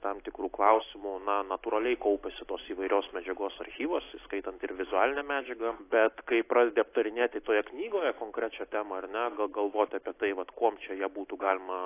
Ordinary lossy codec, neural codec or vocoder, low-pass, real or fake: AAC, 24 kbps; none; 3.6 kHz; real